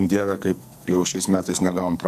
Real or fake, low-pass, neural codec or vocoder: fake; 14.4 kHz; codec, 44.1 kHz, 2.6 kbps, SNAC